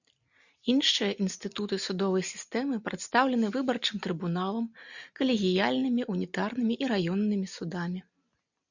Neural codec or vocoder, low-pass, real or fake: none; 7.2 kHz; real